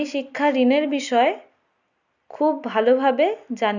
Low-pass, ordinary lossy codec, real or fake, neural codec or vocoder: 7.2 kHz; none; real; none